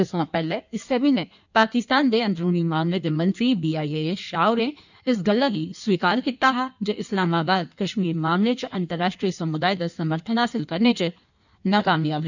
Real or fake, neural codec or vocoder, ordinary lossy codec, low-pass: fake; codec, 16 kHz in and 24 kHz out, 1.1 kbps, FireRedTTS-2 codec; none; 7.2 kHz